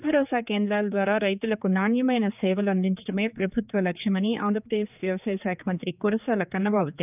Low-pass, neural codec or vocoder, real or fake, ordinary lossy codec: 3.6 kHz; codec, 16 kHz, 4 kbps, X-Codec, HuBERT features, trained on general audio; fake; none